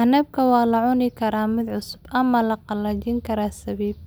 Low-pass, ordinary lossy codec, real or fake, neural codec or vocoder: none; none; real; none